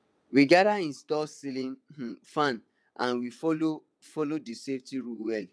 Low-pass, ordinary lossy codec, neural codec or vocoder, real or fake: none; none; vocoder, 22.05 kHz, 80 mel bands, WaveNeXt; fake